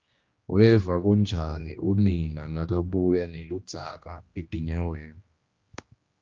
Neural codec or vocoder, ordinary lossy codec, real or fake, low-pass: codec, 16 kHz, 1 kbps, X-Codec, HuBERT features, trained on general audio; Opus, 24 kbps; fake; 7.2 kHz